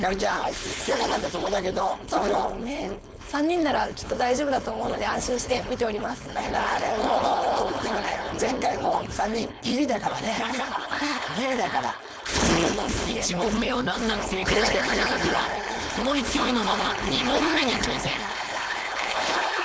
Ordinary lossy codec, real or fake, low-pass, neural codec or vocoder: none; fake; none; codec, 16 kHz, 4.8 kbps, FACodec